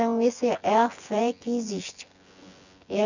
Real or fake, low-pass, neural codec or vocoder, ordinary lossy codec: fake; 7.2 kHz; vocoder, 24 kHz, 100 mel bands, Vocos; none